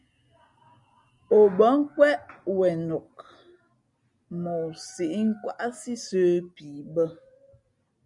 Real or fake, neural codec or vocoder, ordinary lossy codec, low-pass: real; none; MP3, 64 kbps; 10.8 kHz